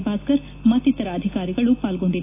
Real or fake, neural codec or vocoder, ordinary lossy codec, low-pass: real; none; AAC, 24 kbps; 3.6 kHz